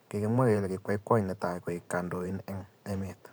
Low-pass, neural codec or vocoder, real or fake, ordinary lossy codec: none; vocoder, 44.1 kHz, 128 mel bands every 512 samples, BigVGAN v2; fake; none